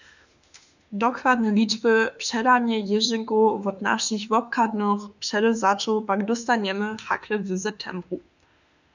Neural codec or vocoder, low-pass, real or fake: autoencoder, 48 kHz, 32 numbers a frame, DAC-VAE, trained on Japanese speech; 7.2 kHz; fake